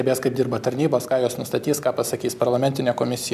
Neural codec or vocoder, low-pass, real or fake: none; 14.4 kHz; real